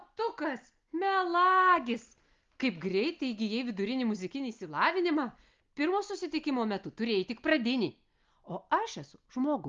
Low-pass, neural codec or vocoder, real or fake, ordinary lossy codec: 7.2 kHz; none; real; Opus, 32 kbps